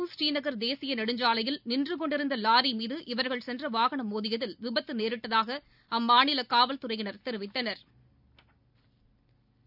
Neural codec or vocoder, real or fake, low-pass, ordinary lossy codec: none; real; 5.4 kHz; none